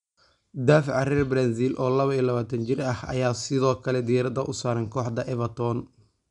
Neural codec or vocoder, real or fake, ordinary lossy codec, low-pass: none; real; none; 10.8 kHz